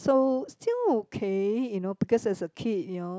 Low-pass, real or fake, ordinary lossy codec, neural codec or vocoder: none; real; none; none